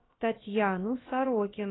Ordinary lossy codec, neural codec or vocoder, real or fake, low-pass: AAC, 16 kbps; codec, 16 kHz, about 1 kbps, DyCAST, with the encoder's durations; fake; 7.2 kHz